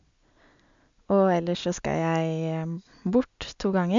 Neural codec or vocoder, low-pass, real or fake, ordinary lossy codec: none; 7.2 kHz; real; MP3, 64 kbps